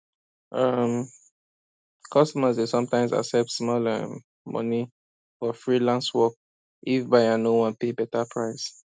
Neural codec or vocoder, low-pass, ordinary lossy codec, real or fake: none; none; none; real